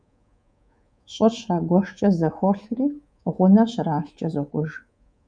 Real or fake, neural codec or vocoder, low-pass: fake; codec, 24 kHz, 3.1 kbps, DualCodec; 9.9 kHz